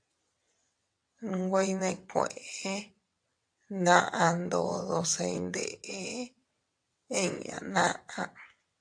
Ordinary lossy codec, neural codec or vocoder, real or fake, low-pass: AAC, 64 kbps; vocoder, 22.05 kHz, 80 mel bands, WaveNeXt; fake; 9.9 kHz